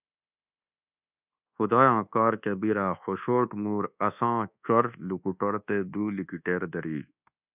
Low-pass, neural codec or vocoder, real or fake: 3.6 kHz; codec, 24 kHz, 1.2 kbps, DualCodec; fake